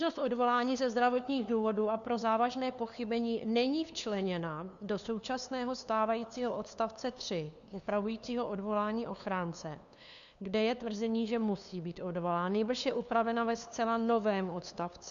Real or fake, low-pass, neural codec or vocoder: fake; 7.2 kHz; codec, 16 kHz, 2 kbps, FunCodec, trained on LibriTTS, 25 frames a second